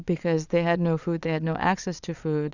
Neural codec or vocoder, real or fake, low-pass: vocoder, 22.05 kHz, 80 mel bands, Vocos; fake; 7.2 kHz